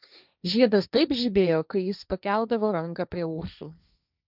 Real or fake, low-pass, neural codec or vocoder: fake; 5.4 kHz; codec, 16 kHz, 1.1 kbps, Voila-Tokenizer